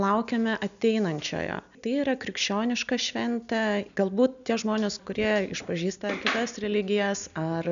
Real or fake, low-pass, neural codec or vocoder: real; 7.2 kHz; none